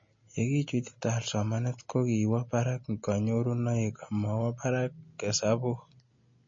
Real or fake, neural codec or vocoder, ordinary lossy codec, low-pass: real; none; MP3, 32 kbps; 7.2 kHz